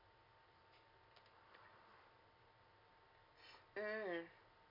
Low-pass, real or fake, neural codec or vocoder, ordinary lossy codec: 5.4 kHz; real; none; none